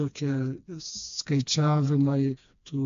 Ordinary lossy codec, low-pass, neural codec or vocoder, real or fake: AAC, 64 kbps; 7.2 kHz; codec, 16 kHz, 2 kbps, FreqCodec, smaller model; fake